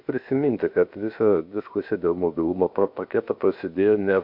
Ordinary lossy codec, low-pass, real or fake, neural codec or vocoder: MP3, 48 kbps; 5.4 kHz; fake; codec, 16 kHz, 0.7 kbps, FocalCodec